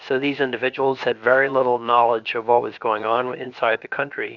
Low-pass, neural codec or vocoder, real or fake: 7.2 kHz; codec, 16 kHz, about 1 kbps, DyCAST, with the encoder's durations; fake